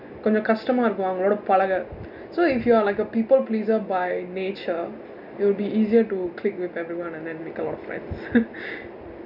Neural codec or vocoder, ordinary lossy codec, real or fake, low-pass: none; none; real; 5.4 kHz